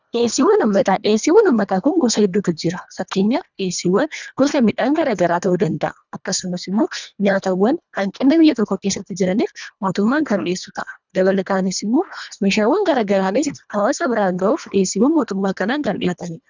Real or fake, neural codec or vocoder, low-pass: fake; codec, 24 kHz, 1.5 kbps, HILCodec; 7.2 kHz